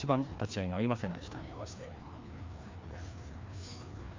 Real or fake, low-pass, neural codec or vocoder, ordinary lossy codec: fake; 7.2 kHz; codec, 16 kHz, 2 kbps, FreqCodec, larger model; MP3, 64 kbps